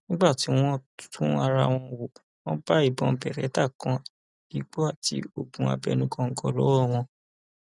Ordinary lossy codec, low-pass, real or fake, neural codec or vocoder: none; 10.8 kHz; real; none